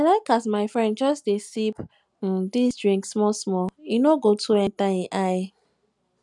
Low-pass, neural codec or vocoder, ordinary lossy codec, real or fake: 10.8 kHz; none; none; real